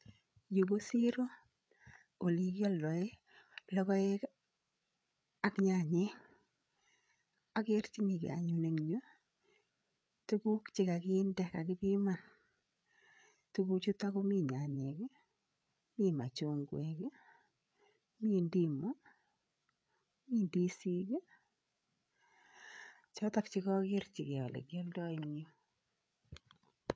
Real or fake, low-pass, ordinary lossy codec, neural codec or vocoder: fake; none; none; codec, 16 kHz, 8 kbps, FreqCodec, larger model